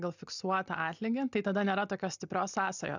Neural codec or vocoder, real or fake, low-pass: none; real; 7.2 kHz